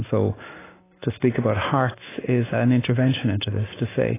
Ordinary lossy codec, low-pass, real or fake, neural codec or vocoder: AAC, 16 kbps; 3.6 kHz; real; none